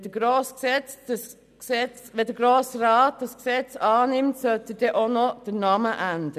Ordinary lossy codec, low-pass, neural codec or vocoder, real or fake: none; 14.4 kHz; none; real